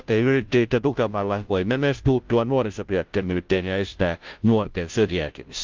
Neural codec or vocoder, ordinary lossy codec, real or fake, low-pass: codec, 16 kHz, 0.5 kbps, FunCodec, trained on Chinese and English, 25 frames a second; Opus, 24 kbps; fake; 7.2 kHz